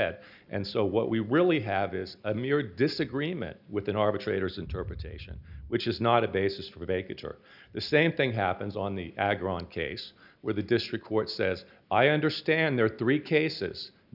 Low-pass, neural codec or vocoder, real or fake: 5.4 kHz; none; real